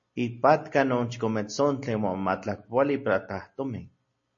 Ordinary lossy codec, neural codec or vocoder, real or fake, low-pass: MP3, 32 kbps; none; real; 7.2 kHz